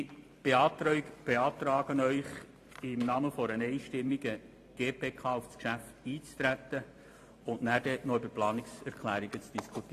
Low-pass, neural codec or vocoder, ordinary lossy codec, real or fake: 14.4 kHz; vocoder, 44.1 kHz, 128 mel bands every 512 samples, BigVGAN v2; AAC, 48 kbps; fake